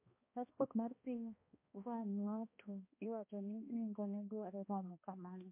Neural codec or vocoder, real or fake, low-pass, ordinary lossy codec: codec, 16 kHz, 1 kbps, X-Codec, HuBERT features, trained on balanced general audio; fake; 3.6 kHz; MP3, 24 kbps